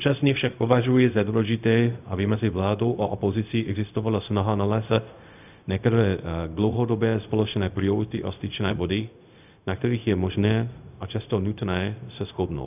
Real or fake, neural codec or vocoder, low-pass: fake; codec, 16 kHz, 0.4 kbps, LongCat-Audio-Codec; 3.6 kHz